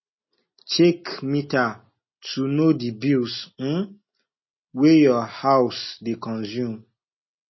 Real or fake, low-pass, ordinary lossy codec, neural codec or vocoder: real; 7.2 kHz; MP3, 24 kbps; none